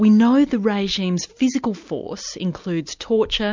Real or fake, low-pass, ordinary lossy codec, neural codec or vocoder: real; 7.2 kHz; MP3, 64 kbps; none